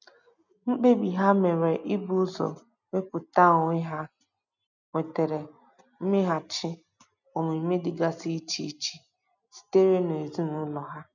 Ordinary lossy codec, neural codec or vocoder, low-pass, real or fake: none; none; 7.2 kHz; real